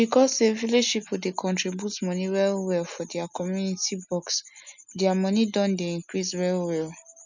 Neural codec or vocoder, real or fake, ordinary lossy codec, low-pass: none; real; none; 7.2 kHz